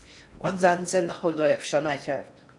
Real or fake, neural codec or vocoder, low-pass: fake; codec, 16 kHz in and 24 kHz out, 0.6 kbps, FocalCodec, streaming, 4096 codes; 10.8 kHz